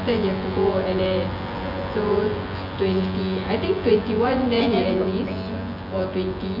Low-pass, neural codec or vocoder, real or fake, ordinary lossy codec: 5.4 kHz; vocoder, 24 kHz, 100 mel bands, Vocos; fake; none